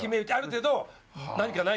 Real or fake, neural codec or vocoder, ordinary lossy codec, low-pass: real; none; none; none